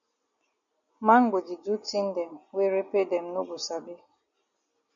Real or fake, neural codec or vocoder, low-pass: real; none; 7.2 kHz